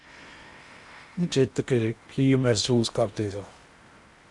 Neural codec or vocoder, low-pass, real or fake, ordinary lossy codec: codec, 16 kHz in and 24 kHz out, 0.8 kbps, FocalCodec, streaming, 65536 codes; 10.8 kHz; fake; Opus, 64 kbps